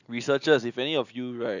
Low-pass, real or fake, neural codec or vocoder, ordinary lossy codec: 7.2 kHz; real; none; none